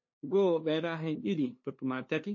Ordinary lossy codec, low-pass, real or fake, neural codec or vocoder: MP3, 32 kbps; 7.2 kHz; fake; codec, 16 kHz, 2 kbps, FunCodec, trained on LibriTTS, 25 frames a second